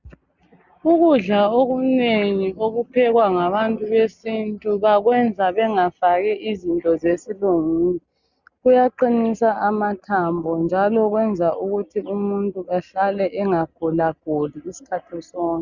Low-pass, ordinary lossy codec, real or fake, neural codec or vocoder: 7.2 kHz; Opus, 64 kbps; real; none